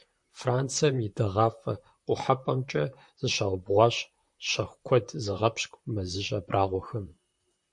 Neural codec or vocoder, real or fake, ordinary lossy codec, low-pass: vocoder, 44.1 kHz, 128 mel bands, Pupu-Vocoder; fake; MP3, 64 kbps; 10.8 kHz